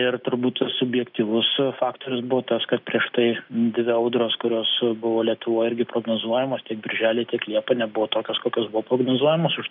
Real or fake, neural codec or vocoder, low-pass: real; none; 5.4 kHz